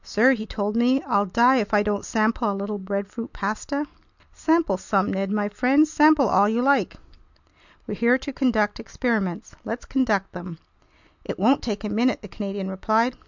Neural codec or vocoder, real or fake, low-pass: none; real; 7.2 kHz